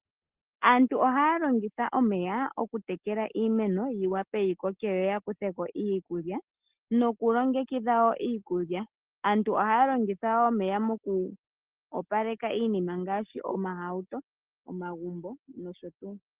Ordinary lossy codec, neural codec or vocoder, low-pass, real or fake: Opus, 16 kbps; none; 3.6 kHz; real